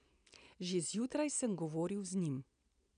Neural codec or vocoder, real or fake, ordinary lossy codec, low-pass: none; real; none; 9.9 kHz